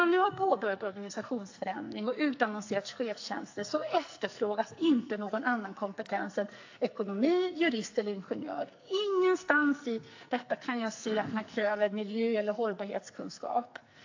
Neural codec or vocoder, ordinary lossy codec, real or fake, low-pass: codec, 44.1 kHz, 2.6 kbps, SNAC; AAC, 48 kbps; fake; 7.2 kHz